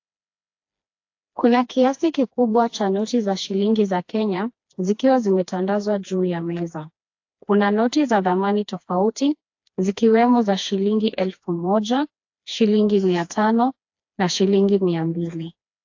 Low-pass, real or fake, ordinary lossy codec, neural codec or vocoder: 7.2 kHz; fake; AAC, 48 kbps; codec, 16 kHz, 2 kbps, FreqCodec, smaller model